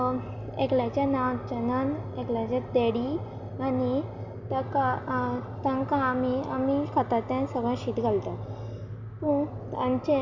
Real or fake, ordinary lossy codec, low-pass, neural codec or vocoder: real; none; 7.2 kHz; none